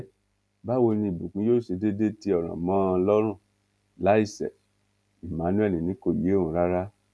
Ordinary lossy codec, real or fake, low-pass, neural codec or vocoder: none; real; none; none